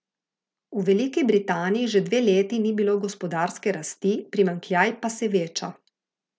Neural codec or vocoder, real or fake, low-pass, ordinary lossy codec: none; real; none; none